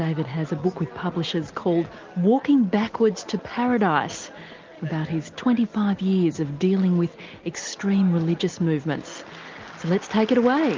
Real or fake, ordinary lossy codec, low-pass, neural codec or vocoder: real; Opus, 32 kbps; 7.2 kHz; none